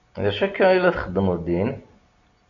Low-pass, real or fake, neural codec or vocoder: 7.2 kHz; real; none